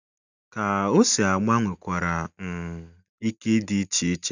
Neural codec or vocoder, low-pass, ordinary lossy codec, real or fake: none; 7.2 kHz; none; real